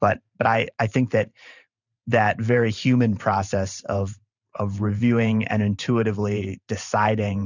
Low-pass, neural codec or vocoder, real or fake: 7.2 kHz; vocoder, 44.1 kHz, 128 mel bands every 512 samples, BigVGAN v2; fake